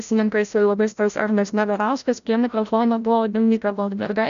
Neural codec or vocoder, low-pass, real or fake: codec, 16 kHz, 0.5 kbps, FreqCodec, larger model; 7.2 kHz; fake